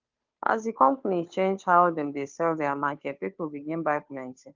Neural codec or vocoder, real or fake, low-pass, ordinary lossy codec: codec, 16 kHz, 2 kbps, FunCodec, trained on Chinese and English, 25 frames a second; fake; 7.2 kHz; Opus, 32 kbps